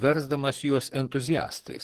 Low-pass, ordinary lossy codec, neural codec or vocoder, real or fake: 14.4 kHz; Opus, 16 kbps; codec, 44.1 kHz, 2.6 kbps, SNAC; fake